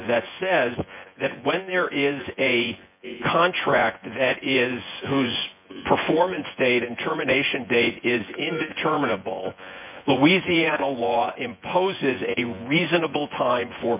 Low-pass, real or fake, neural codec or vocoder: 3.6 kHz; fake; vocoder, 24 kHz, 100 mel bands, Vocos